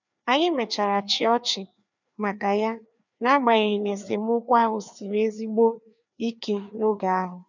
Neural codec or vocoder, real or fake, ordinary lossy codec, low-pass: codec, 16 kHz, 2 kbps, FreqCodec, larger model; fake; none; 7.2 kHz